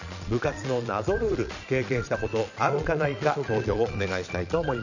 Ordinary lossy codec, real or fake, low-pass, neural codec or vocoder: none; fake; 7.2 kHz; vocoder, 22.05 kHz, 80 mel bands, Vocos